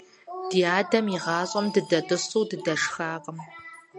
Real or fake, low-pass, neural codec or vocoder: real; 10.8 kHz; none